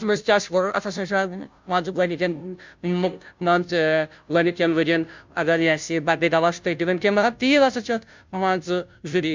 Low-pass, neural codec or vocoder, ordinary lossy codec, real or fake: 7.2 kHz; codec, 16 kHz, 0.5 kbps, FunCodec, trained on Chinese and English, 25 frames a second; MP3, 64 kbps; fake